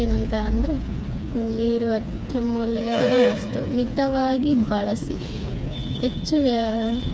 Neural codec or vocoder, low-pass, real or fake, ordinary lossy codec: codec, 16 kHz, 4 kbps, FreqCodec, smaller model; none; fake; none